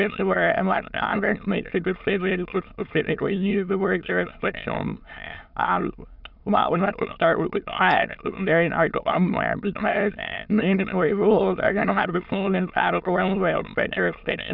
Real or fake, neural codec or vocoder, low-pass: fake; autoencoder, 22.05 kHz, a latent of 192 numbers a frame, VITS, trained on many speakers; 5.4 kHz